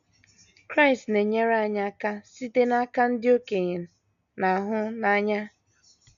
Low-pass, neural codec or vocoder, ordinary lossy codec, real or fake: 7.2 kHz; none; none; real